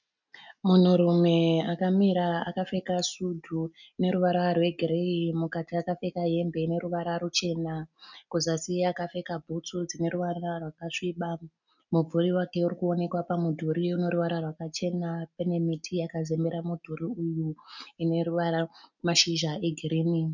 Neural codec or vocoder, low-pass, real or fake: none; 7.2 kHz; real